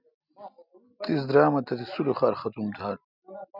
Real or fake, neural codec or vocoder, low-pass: real; none; 5.4 kHz